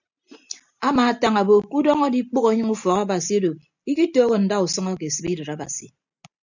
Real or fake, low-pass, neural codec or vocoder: real; 7.2 kHz; none